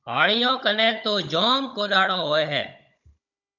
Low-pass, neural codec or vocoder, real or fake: 7.2 kHz; codec, 16 kHz, 16 kbps, FunCodec, trained on Chinese and English, 50 frames a second; fake